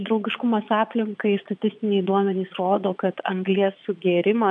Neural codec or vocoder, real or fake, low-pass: vocoder, 22.05 kHz, 80 mel bands, Vocos; fake; 9.9 kHz